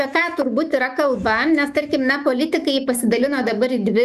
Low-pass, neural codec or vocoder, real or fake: 14.4 kHz; autoencoder, 48 kHz, 128 numbers a frame, DAC-VAE, trained on Japanese speech; fake